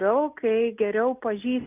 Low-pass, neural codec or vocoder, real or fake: 3.6 kHz; none; real